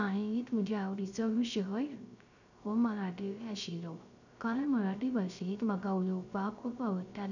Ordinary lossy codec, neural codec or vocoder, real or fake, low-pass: none; codec, 16 kHz, 0.3 kbps, FocalCodec; fake; 7.2 kHz